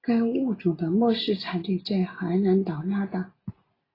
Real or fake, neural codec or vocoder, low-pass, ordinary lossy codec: real; none; 5.4 kHz; AAC, 24 kbps